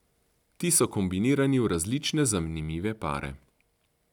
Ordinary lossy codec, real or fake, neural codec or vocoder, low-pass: none; real; none; 19.8 kHz